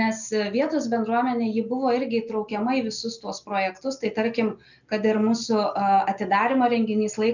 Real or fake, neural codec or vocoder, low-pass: real; none; 7.2 kHz